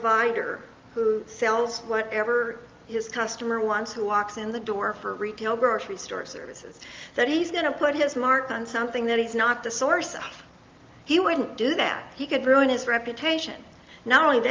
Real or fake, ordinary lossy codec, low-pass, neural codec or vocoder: real; Opus, 32 kbps; 7.2 kHz; none